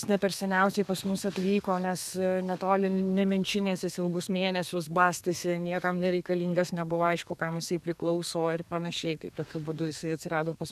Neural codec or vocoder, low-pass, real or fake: codec, 32 kHz, 1.9 kbps, SNAC; 14.4 kHz; fake